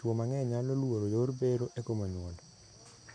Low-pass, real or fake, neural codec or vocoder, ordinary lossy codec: 9.9 kHz; real; none; none